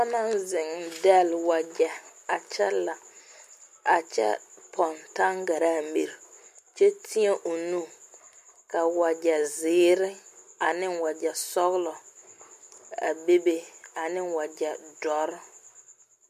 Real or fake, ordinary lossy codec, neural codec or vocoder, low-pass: real; MP3, 64 kbps; none; 14.4 kHz